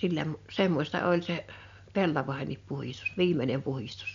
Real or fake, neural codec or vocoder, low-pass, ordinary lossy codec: real; none; 7.2 kHz; MP3, 64 kbps